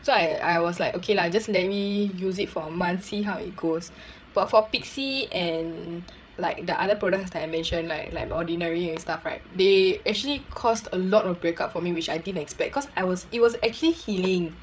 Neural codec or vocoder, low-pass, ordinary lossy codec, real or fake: codec, 16 kHz, 8 kbps, FreqCodec, larger model; none; none; fake